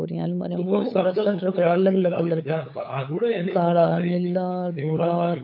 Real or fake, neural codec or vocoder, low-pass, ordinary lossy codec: fake; codec, 16 kHz, 8 kbps, FunCodec, trained on LibriTTS, 25 frames a second; 5.4 kHz; AAC, 32 kbps